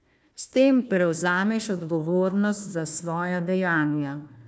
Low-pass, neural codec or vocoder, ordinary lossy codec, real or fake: none; codec, 16 kHz, 1 kbps, FunCodec, trained on Chinese and English, 50 frames a second; none; fake